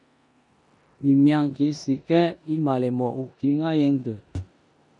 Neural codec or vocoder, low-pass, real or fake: codec, 16 kHz in and 24 kHz out, 0.9 kbps, LongCat-Audio-Codec, four codebook decoder; 10.8 kHz; fake